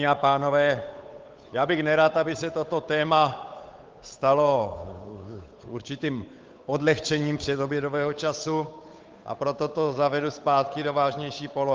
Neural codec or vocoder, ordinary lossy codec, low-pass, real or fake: codec, 16 kHz, 8 kbps, FunCodec, trained on Chinese and English, 25 frames a second; Opus, 24 kbps; 7.2 kHz; fake